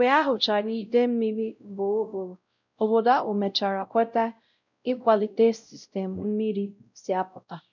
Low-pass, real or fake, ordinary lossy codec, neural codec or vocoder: 7.2 kHz; fake; none; codec, 16 kHz, 0.5 kbps, X-Codec, WavLM features, trained on Multilingual LibriSpeech